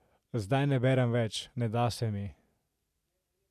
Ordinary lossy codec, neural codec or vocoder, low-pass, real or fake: none; none; 14.4 kHz; real